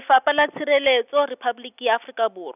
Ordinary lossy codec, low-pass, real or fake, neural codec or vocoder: none; 3.6 kHz; real; none